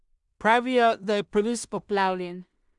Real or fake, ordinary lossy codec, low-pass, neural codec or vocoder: fake; none; 10.8 kHz; codec, 16 kHz in and 24 kHz out, 0.4 kbps, LongCat-Audio-Codec, two codebook decoder